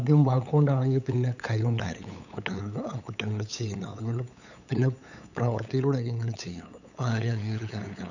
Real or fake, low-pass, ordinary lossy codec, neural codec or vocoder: fake; 7.2 kHz; none; codec, 16 kHz, 8 kbps, FunCodec, trained on LibriTTS, 25 frames a second